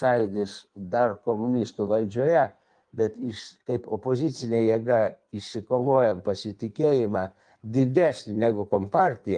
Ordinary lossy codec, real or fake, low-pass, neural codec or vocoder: Opus, 32 kbps; fake; 9.9 kHz; codec, 16 kHz in and 24 kHz out, 1.1 kbps, FireRedTTS-2 codec